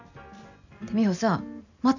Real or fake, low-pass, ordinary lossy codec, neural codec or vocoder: real; 7.2 kHz; none; none